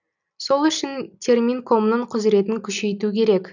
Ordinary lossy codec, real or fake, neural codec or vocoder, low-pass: none; real; none; 7.2 kHz